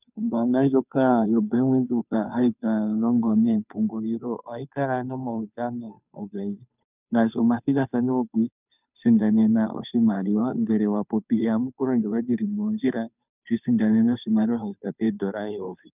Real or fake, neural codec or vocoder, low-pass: fake; codec, 16 kHz, 2 kbps, FunCodec, trained on Chinese and English, 25 frames a second; 3.6 kHz